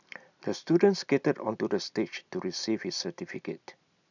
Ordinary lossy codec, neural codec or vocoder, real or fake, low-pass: none; none; real; 7.2 kHz